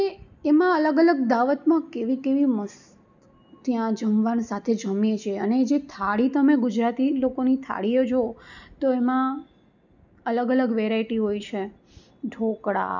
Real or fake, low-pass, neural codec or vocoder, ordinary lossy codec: real; 7.2 kHz; none; none